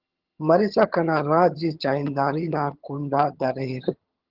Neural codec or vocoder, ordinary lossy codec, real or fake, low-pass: vocoder, 22.05 kHz, 80 mel bands, HiFi-GAN; Opus, 16 kbps; fake; 5.4 kHz